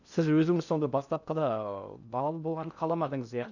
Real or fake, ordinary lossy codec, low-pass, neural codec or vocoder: fake; none; 7.2 kHz; codec, 16 kHz in and 24 kHz out, 0.6 kbps, FocalCodec, streaming, 2048 codes